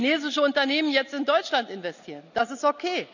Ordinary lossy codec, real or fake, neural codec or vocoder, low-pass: none; real; none; 7.2 kHz